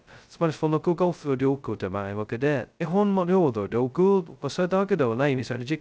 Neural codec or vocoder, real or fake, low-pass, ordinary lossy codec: codec, 16 kHz, 0.2 kbps, FocalCodec; fake; none; none